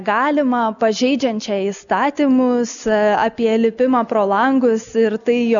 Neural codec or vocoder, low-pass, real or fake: none; 7.2 kHz; real